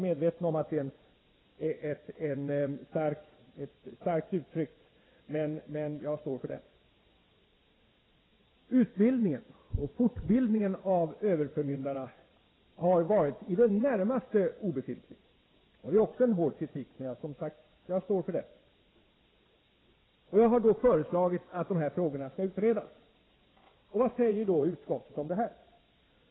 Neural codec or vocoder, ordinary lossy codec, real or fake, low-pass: vocoder, 22.05 kHz, 80 mel bands, WaveNeXt; AAC, 16 kbps; fake; 7.2 kHz